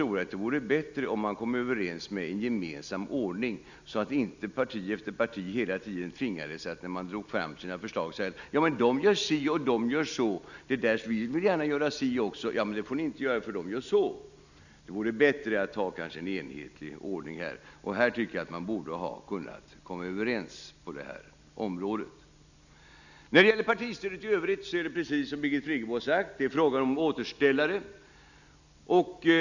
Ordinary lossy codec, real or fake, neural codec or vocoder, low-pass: none; real; none; 7.2 kHz